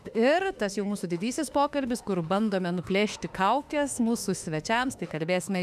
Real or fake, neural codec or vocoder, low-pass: fake; autoencoder, 48 kHz, 32 numbers a frame, DAC-VAE, trained on Japanese speech; 14.4 kHz